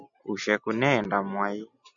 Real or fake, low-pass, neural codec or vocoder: real; 7.2 kHz; none